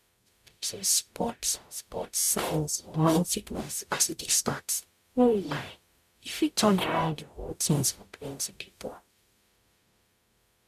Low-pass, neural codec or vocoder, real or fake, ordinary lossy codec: 14.4 kHz; codec, 44.1 kHz, 0.9 kbps, DAC; fake; none